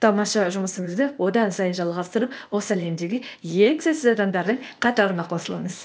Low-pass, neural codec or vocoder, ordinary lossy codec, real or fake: none; codec, 16 kHz, 0.8 kbps, ZipCodec; none; fake